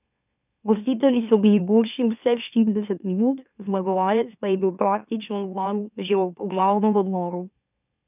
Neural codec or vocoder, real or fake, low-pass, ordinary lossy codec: autoencoder, 44.1 kHz, a latent of 192 numbers a frame, MeloTTS; fake; 3.6 kHz; none